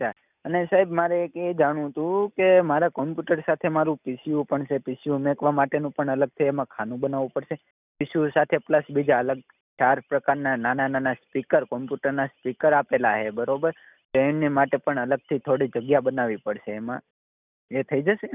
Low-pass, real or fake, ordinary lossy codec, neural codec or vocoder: 3.6 kHz; real; none; none